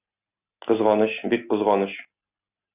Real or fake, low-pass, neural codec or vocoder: real; 3.6 kHz; none